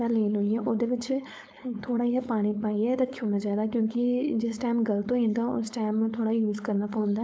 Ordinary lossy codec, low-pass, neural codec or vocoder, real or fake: none; none; codec, 16 kHz, 4.8 kbps, FACodec; fake